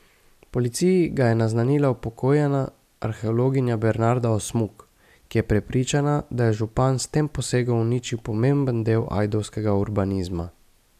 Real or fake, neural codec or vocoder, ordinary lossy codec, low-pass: real; none; none; 14.4 kHz